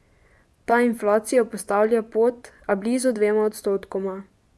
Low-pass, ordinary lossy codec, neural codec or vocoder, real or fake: none; none; vocoder, 24 kHz, 100 mel bands, Vocos; fake